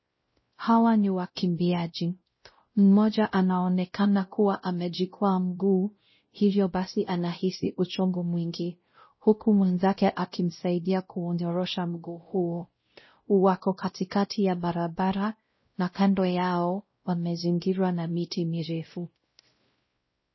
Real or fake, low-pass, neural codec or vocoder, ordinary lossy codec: fake; 7.2 kHz; codec, 16 kHz, 0.5 kbps, X-Codec, WavLM features, trained on Multilingual LibriSpeech; MP3, 24 kbps